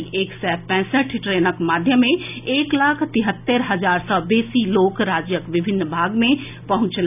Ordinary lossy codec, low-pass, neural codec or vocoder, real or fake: none; 3.6 kHz; none; real